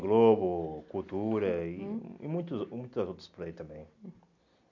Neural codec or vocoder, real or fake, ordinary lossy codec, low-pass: none; real; none; 7.2 kHz